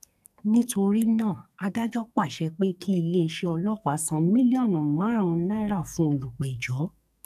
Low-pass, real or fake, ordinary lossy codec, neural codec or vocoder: 14.4 kHz; fake; none; codec, 44.1 kHz, 2.6 kbps, SNAC